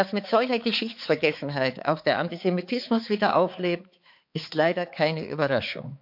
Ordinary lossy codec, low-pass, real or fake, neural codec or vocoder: MP3, 48 kbps; 5.4 kHz; fake; codec, 16 kHz, 4 kbps, X-Codec, HuBERT features, trained on balanced general audio